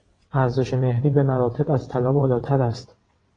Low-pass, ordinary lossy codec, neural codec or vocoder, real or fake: 9.9 kHz; AAC, 32 kbps; vocoder, 22.05 kHz, 80 mel bands, WaveNeXt; fake